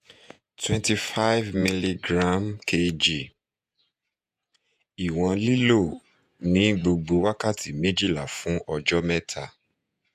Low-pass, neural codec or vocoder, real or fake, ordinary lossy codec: 14.4 kHz; vocoder, 48 kHz, 128 mel bands, Vocos; fake; AAC, 96 kbps